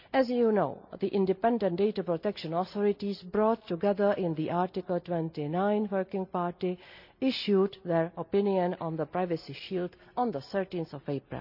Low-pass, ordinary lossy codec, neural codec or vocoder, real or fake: 5.4 kHz; none; none; real